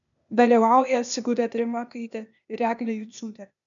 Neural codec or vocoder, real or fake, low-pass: codec, 16 kHz, 0.8 kbps, ZipCodec; fake; 7.2 kHz